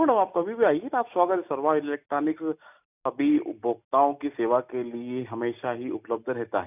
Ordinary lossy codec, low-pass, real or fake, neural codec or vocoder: none; 3.6 kHz; real; none